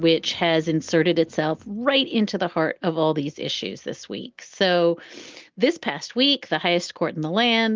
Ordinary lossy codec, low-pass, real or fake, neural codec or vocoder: Opus, 32 kbps; 7.2 kHz; real; none